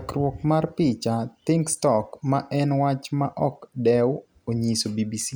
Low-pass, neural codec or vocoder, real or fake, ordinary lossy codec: none; none; real; none